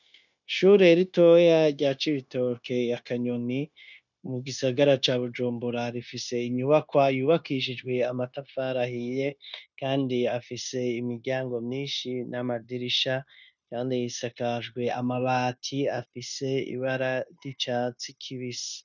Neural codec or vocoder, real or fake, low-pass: codec, 16 kHz, 0.9 kbps, LongCat-Audio-Codec; fake; 7.2 kHz